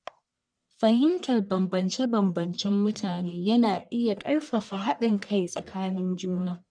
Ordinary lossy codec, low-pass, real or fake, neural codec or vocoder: none; 9.9 kHz; fake; codec, 44.1 kHz, 1.7 kbps, Pupu-Codec